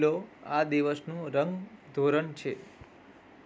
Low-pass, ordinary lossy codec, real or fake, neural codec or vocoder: none; none; real; none